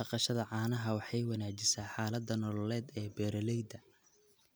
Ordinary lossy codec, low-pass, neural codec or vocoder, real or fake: none; none; none; real